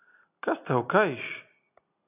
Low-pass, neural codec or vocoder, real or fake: 3.6 kHz; none; real